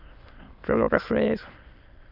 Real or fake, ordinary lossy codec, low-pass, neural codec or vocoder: fake; Opus, 24 kbps; 5.4 kHz; autoencoder, 22.05 kHz, a latent of 192 numbers a frame, VITS, trained on many speakers